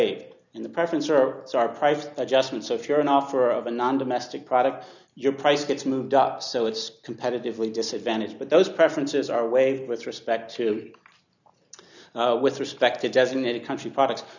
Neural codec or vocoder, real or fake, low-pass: none; real; 7.2 kHz